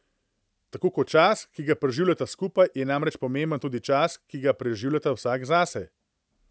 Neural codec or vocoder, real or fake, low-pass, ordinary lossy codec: none; real; none; none